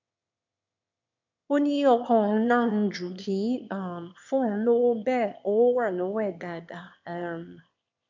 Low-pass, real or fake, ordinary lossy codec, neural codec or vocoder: 7.2 kHz; fake; MP3, 64 kbps; autoencoder, 22.05 kHz, a latent of 192 numbers a frame, VITS, trained on one speaker